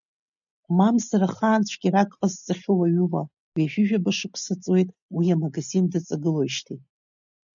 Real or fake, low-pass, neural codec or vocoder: real; 7.2 kHz; none